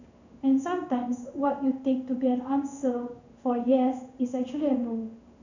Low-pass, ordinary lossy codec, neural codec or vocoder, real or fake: 7.2 kHz; none; codec, 16 kHz in and 24 kHz out, 1 kbps, XY-Tokenizer; fake